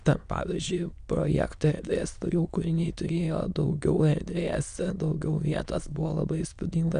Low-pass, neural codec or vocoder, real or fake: 9.9 kHz; autoencoder, 22.05 kHz, a latent of 192 numbers a frame, VITS, trained on many speakers; fake